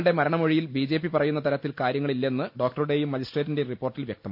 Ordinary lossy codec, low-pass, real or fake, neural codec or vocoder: AAC, 48 kbps; 5.4 kHz; real; none